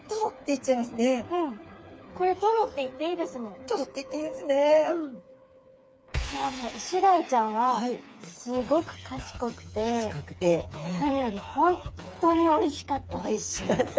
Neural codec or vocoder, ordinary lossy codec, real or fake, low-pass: codec, 16 kHz, 4 kbps, FreqCodec, smaller model; none; fake; none